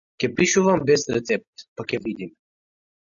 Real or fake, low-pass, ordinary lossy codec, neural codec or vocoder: real; 7.2 kHz; MP3, 96 kbps; none